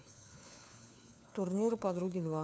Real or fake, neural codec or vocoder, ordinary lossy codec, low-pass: fake; codec, 16 kHz, 4 kbps, FunCodec, trained on LibriTTS, 50 frames a second; none; none